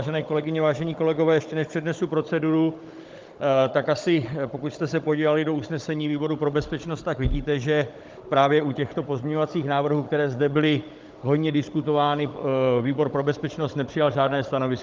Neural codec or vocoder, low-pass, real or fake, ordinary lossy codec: codec, 16 kHz, 16 kbps, FunCodec, trained on Chinese and English, 50 frames a second; 7.2 kHz; fake; Opus, 24 kbps